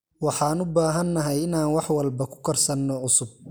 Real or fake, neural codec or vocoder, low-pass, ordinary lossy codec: real; none; none; none